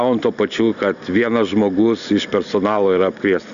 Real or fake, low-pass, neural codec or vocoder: real; 7.2 kHz; none